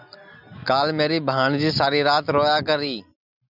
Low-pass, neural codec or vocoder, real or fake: 5.4 kHz; none; real